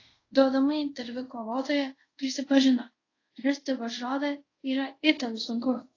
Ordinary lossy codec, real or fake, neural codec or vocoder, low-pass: AAC, 32 kbps; fake; codec, 24 kHz, 0.5 kbps, DualCodec; 7.2 kHz